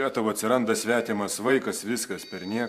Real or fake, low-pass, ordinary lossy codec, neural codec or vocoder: fake; 14.4 kHz; AAC, 96 kbps; vocoder, 44.1 kHz, 128 mel bands every 512 samples, BigVGAN v2